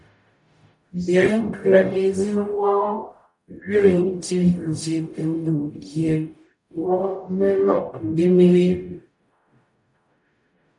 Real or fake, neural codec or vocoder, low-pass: fake; codec, 44.1 kHz, 0.9 kbps, DAC; 10.8 kHz